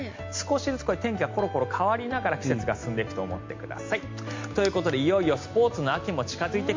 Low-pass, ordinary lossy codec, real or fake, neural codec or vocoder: 7.2 kHz; MP3, 48 kbps; real; none